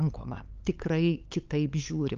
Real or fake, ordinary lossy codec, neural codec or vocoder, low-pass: fake; Opus, 24 kbps; codec, 16 kHz, 8 kbps, FunCodec, trained on LibriTTS, 25 frames a second; 7.2 kHz